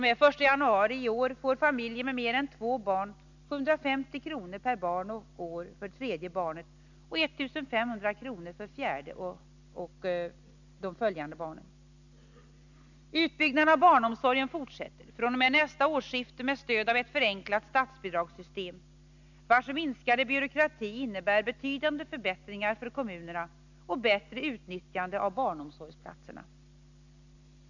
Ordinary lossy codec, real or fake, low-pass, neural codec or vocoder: none; real; 7.2 kHz; none